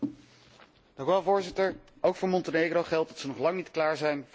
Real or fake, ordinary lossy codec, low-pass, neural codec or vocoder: real; none; none; none